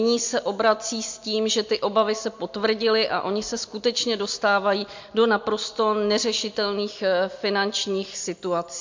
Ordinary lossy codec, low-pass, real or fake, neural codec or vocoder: MP3, 48 kbps; 7.2 kHz; real; none